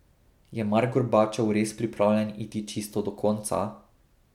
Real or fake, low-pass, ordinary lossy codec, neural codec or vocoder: real; 19.8 kHz; MP3, 96 kbps; none